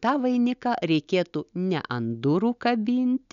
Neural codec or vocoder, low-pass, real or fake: none; 7.2 kHz; real